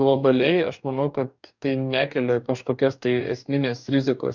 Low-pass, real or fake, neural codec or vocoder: 7.2 kHz; fake; codec, 44.1 kHz, 2.6 kbps, DAC